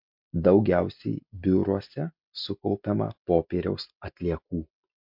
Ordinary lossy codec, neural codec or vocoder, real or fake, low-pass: MP3, 48 kbps; none; real; 5.4 kHz